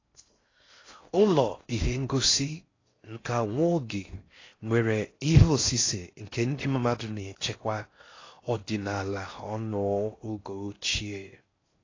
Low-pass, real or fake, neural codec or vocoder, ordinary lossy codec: 7.2 kHz; fake; codec, 16 kHz in and 24 kHz out, 0.6 kbps, FocalCodec, streaming, 4096 codes; AAC, 32 kbps